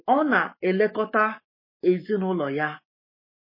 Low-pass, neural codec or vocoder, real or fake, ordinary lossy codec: 5.4 kHz; codec, 44.1 kHz, 7.8 kbps, Pupu-Codec; fake; MP3, 24 kbps